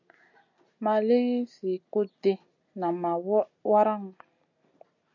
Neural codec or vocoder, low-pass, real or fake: none; 7.2 kHz; real